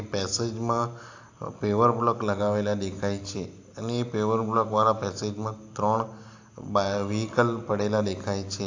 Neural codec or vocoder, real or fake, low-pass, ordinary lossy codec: none; real; 7.2 kHz; AAC, 48 kbps